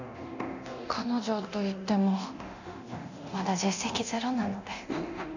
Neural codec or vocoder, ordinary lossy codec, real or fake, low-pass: codec, 24 kHz, 0.9 kbps, DualCodec; none; fake; 7.2 kHz